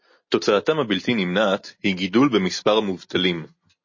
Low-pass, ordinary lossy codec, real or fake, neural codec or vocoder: 7.2 kHz; MP3, 32 kbps; real; none